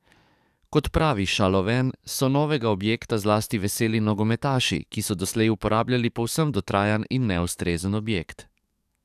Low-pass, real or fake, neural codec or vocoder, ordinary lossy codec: 14.4 kHz; fake; codec, 44.1 kHz, 7.8 kbps, DAC; none